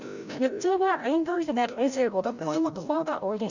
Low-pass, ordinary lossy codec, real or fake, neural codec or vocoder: 7.2 kHz; none; fake; codec, 16 kHz, 0.5 kbps, FreqCodec, larger model